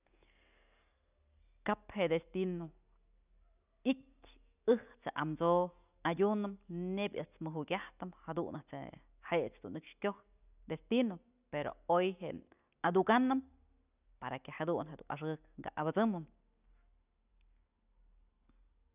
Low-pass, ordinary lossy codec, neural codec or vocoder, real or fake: 3.6 kHz; none; none; real